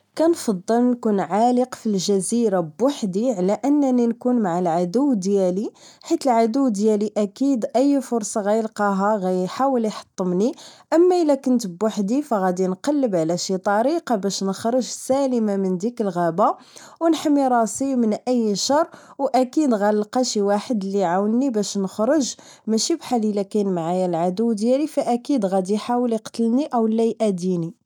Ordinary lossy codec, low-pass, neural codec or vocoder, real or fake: none; 19.8 kHz; none; real